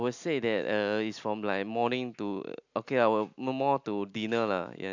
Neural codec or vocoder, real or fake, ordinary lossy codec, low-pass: none; real; none; 7.2 kHz